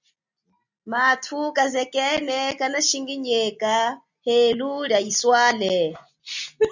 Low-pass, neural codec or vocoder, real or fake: 7.2 kHz; none; real